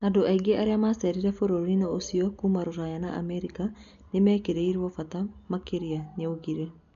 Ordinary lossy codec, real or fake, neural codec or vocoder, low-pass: none; real; none; 7.2 kHz